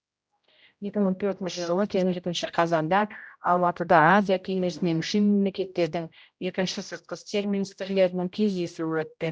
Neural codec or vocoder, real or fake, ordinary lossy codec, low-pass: codec, 16 kHz, 0.5 kbps, X-Codec, HuBERT features, trained on general audio; fake; none; none